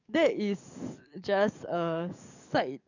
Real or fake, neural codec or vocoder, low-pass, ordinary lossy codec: fake; codec, 44.1 kHz, 7.8 kbps, DAC; 7.2 kHz; none